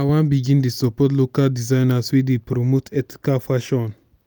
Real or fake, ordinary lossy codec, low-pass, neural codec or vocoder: real; none; none; none